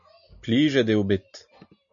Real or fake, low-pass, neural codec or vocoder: real; 7.2 kHz; none